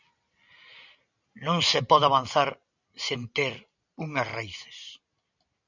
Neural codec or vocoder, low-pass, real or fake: none; 7.2 kHz; real